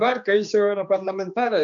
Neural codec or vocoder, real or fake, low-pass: codec, 16 kHz, 2 kbps, X-Codec, HuBERT features, trained on general audio; fake; 7.2 kHz